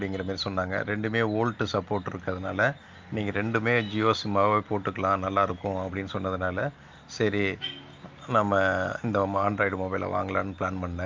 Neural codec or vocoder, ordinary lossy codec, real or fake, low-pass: none; Opus, 32 kbps; real; 7.2 kHz